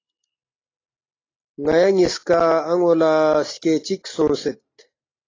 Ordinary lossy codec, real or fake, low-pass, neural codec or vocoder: AAC, 32 kbps; real; 7.2 kHz; none